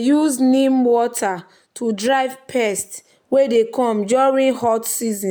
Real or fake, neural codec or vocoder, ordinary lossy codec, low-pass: real; none; none; none